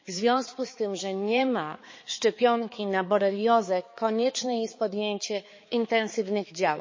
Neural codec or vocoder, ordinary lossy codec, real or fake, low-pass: codec, 16 kHz, 4 kbps, X-Codec, HuBERT features, trained on balanced general audio; MP3, 32 kbps; fake; 7.2 kHz